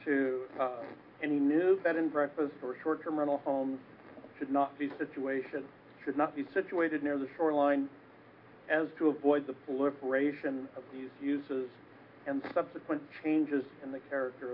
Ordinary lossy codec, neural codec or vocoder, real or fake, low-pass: AAC, 32 kbps; none; real; 5.4 kHz